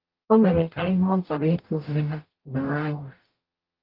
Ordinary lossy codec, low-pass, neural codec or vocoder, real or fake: Opus, 24 kbps; 5.4 kHz; codec, 44.1 kHz, 0.9 kbps, DAC; fake